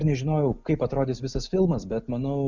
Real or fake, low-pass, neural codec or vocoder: real; 7.2 kHz; none